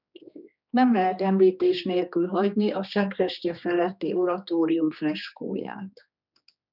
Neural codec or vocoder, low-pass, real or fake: codec, 16 kHz, 2 kbps, X-Codec, HuBERT features, trained on general audio; 5.4 kHz; fake